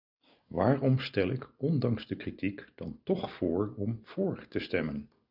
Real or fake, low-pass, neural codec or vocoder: real; 5.4 kHz; none